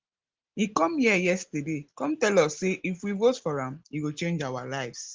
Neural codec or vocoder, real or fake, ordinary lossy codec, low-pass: none; real; Opus, 16 kbps; 7.2 kHz